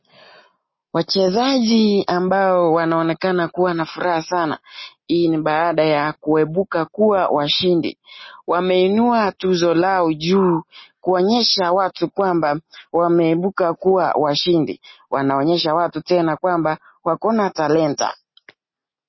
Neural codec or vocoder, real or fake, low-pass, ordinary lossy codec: none; real; 7.2 kHz; MP3, 24 kbps